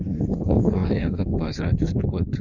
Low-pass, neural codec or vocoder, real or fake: 7.2 kHz; codec, 16 kHz in and 24 kHz out, 1.1 kbps, FireRedTTS-2 codec; fake